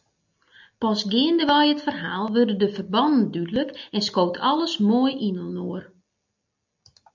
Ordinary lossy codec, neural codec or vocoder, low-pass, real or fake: MP3, 64 kbps; none; 7.2 kHz; real